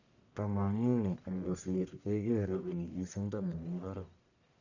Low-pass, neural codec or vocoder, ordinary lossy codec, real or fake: 7.2 kHz; codec, 44.1 kHz, 1.7 kbps, Pupu-Codec; none; fake